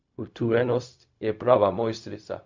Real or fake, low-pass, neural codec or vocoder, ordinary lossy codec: fake; 7.2 kHz; codec, 16 kHz, 0.4 kbps, LongCat-Audio-Codec; MP3, 64 kbps